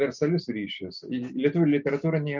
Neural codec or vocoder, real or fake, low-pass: none; real; 7.2 kHz